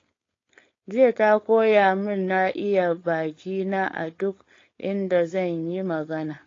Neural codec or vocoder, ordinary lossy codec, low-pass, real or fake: codec, 16 kHz, 4.8 kbps, FACodec; AAC, 32 kbps; 7.2 kHz; fake